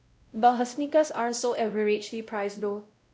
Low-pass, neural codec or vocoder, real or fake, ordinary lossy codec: none; codec, 16 kHz, 0.5 kbps, X-Codec, WavLM features, trained on Multilingual LibriSpeech; fake; none